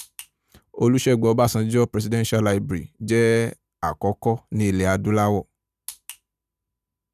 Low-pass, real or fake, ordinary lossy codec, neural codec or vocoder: 14.4 kHz; real; none; none